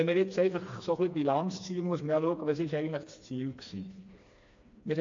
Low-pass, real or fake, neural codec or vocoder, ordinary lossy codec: 7.2 kHz; fake; codec, 16 kHz, 2 kbps, FreqCodec, smaller model; AAC, 48 kbps